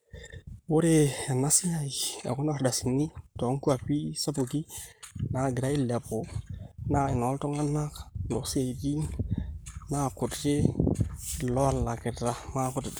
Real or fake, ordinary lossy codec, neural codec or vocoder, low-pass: fake; none; codec, 44.1 kHz, 7.8 kbps, Pupu-Codec; none